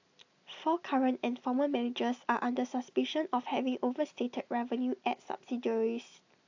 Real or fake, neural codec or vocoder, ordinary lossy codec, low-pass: real; none; AAC, 48 kbps; 7.2 kHz